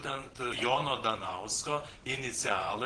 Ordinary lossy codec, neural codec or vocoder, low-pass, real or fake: Opus, 16 kbps; vocoder, 22.05 kHz, 80 mel bands, WaveNeXt; 9.9 kHz; fake